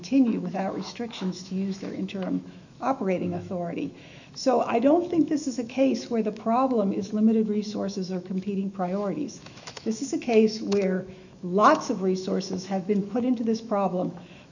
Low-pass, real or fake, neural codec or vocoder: 7.2 kHz; fake; autoencoder, 48 kHz, 128 numbers a frame, DAC-VAE, trained on Japanese speech